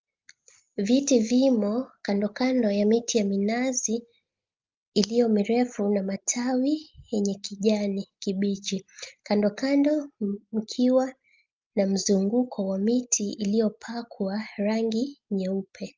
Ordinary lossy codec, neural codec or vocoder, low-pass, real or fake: Opus, 24 kbps; none; 7.2 kHz; real